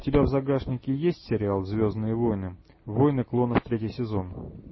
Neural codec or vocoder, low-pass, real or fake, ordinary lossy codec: none; 7.2 kHz; real; MP3, 24 kbps